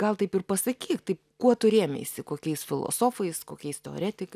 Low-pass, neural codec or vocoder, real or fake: 14.4 kHz; none; real